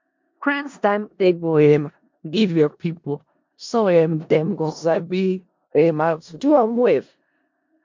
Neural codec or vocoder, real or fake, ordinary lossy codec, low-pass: codec, 16 kHz in and 24 kHz out, 0.4 kbps, LongCat-Audio-Codec, four codebook decoder; fake; MP3, 48 kbps; 7.2 kHz